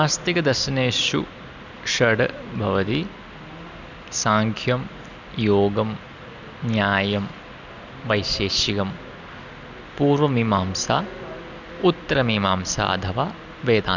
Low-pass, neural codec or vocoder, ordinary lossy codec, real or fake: 7.2 kHz; none; none; real